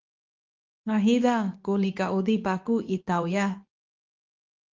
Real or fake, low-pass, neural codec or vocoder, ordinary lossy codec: fake; 7.2 kHz; codec, 24 kHz, 0.9 kbps, WavTokenizer, medium speech release version 2; Opus, 32 kbps